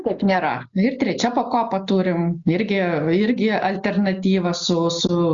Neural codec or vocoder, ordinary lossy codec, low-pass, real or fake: none; Opus, 64 kbps; 7.2 kHz; real